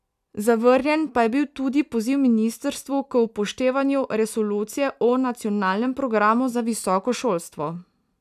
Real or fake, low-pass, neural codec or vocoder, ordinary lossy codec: real; 14.4 kHz; none; none